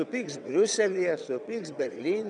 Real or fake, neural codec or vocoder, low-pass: fake; vocoder, 22.05 kHz, 80 mel bands, WaveNeXt; 9.9 kHz